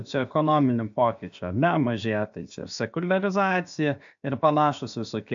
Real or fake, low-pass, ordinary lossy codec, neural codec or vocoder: fake; 7.2 kHz; AAC, 64 kbps; codec, 16 kHz, about 1 kbps, DyCAST, with the encoder's durations